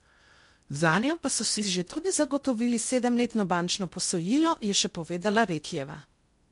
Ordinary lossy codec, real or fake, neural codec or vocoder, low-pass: MP3, 64 kbps; fake; codec, 16 kHz in and 24 kHz out, 0.6 kbps, FocalCodec, streaming, 2048 codes; 10.8 kHz